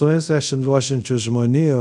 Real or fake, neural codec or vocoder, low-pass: fake; codec, 24 kHz, 0.5 kbps, DualCodec; 10.8 kHz